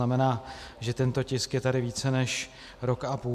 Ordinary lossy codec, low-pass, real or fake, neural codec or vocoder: MP3, 96 kbps; 14.4 kHz; real; none